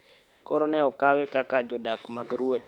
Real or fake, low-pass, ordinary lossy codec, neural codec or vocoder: fake; 19.8 kHz; none; autoencoder, 48 kHz, 32 numbers a frame, DAC-VAE, trained on Japanese speech